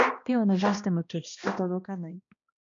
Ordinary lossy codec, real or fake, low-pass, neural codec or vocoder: MP3, 64 kbps; fake; 7.2 kHz; codec, 16 kHz, 1 kbps, X-Codec, HuBERT features, trained on balanced general audio